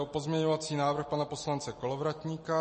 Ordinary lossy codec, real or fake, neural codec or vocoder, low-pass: MP3, 32 kbps; real; none; 10.8 kHz